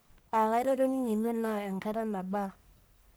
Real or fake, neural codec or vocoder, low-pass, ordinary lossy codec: fake; codec, 44.1 kHz, 1.7 kbps, Pupu-Codec; none; none